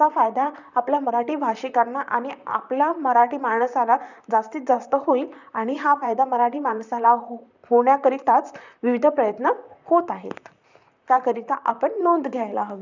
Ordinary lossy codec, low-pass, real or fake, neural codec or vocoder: none; 7.2 kHz; fake; vocoder, 44.1 kHz, 128 mel bands, Pupu-Vocoder